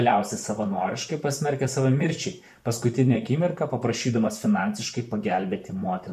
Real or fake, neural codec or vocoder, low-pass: fake; vocoder, 44.1 kHz, 128 mel bands, Pupu-Vocoder; 14.4 kHz